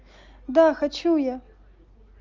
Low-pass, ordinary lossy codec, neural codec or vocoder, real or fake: 7.2 kHz; Opus, 24 kbps; none; real